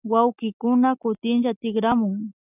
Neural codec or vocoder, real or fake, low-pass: none; real; 3.6 kHz